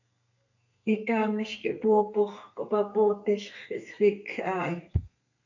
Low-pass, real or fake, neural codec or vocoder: 7.2 kHz; fake; codec, 32 kHz, 1.9 kbps, SNAC